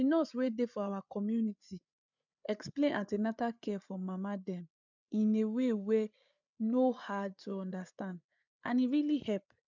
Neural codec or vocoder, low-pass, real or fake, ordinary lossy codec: codec, 16 kHz, 8 kbps, FreqCodec, larger model; 7.2 kHz; fake; none